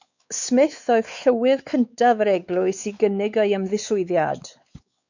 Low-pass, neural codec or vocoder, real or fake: 7.2 kHz; codec, 16 kHz, 4 kbps, X-Codec, WavLM features, trained on Multilingual LibriSpeech; fake